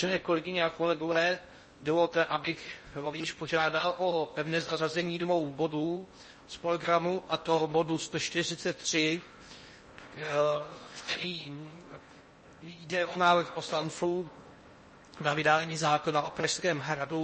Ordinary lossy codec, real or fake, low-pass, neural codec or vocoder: MP3, 32 kbps; fake; 10.8 kHz; codec, 16 kHz in and 24 kHz out, 0.6 kbps, FocalCodec, streaming, 4096 codes